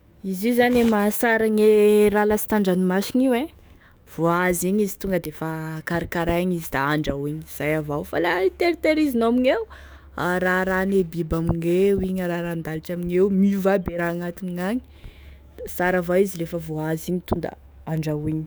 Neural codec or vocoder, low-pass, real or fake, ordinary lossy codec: autoencoder, 48 kHz, 128 numbers a frame, DAC-VAE, trained on Japanese speech; none; fake; none